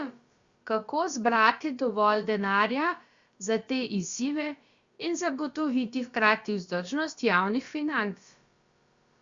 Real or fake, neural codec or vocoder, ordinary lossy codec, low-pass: fake; codec, 16 kHz, about 1 kbps, DyCAST, with the encoder's durations; Opus, 64 kbps; 7.2 kHz